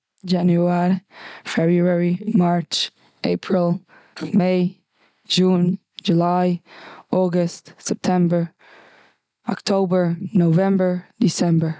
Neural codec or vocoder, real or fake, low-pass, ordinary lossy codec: none; real; none; none